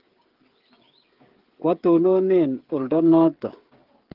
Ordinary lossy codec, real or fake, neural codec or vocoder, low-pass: Opus, 16 kbps; fake; codec, 16 kHz, 16 kbps, FreqCodec, smaller model; 5.4 kHz